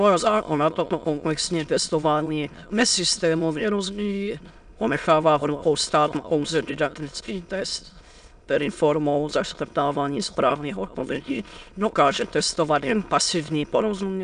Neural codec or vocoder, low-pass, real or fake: autoencoder, 22.05 kHz, a latent of 192 numbers a frame, VITS, trained on many speakers; 9.9 kHz; fake